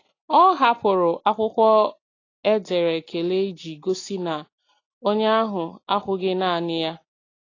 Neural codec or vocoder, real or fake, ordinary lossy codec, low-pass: none; real; AAC, 32 kbps; 7.2 kHz